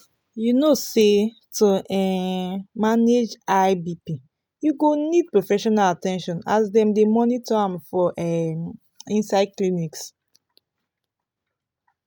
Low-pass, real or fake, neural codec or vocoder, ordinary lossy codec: none; real; none; none